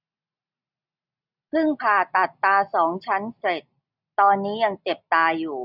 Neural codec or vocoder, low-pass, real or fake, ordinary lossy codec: none; 5.4 kHz; real; none